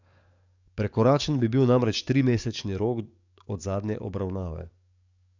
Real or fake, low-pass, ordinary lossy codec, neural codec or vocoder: fake; 7.2 kHz; none; codec, 44.1 kHz, 7.8 kbps, DAC